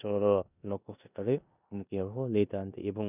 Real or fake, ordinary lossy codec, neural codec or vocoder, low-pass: fake; none; codec, 16 kHz in and 24 kHz out, 0.9 kbps, LongCat-Audio-Codec, four codebook decoder; 3.6 kHz